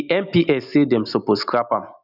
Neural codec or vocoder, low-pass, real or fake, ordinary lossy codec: none; 5.4 kHz; real; none